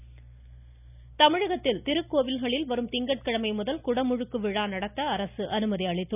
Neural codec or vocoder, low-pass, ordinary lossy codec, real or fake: none; 3.6 kHz; none; real